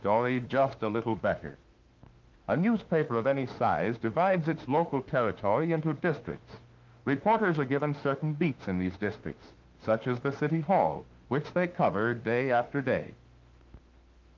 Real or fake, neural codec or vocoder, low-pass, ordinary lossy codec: fake; autoencoder, 48 kHz, 32 numbers a frame, DAC-VAE, trained on Japanese speech; 7.2 kHz; Opus, 32 kbps